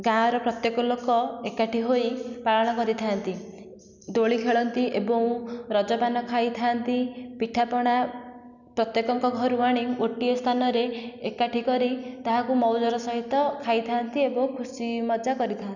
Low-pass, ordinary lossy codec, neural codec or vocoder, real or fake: 7.2 kHz; none; none; real